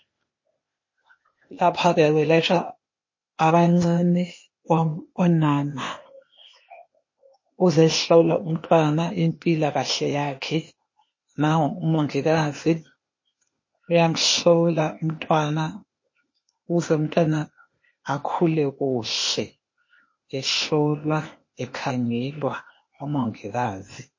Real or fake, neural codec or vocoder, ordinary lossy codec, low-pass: fake; codec, 16 kHz, 0.8 kbps, ZipCodec; MP3, 32 kbps; 7.2 kHz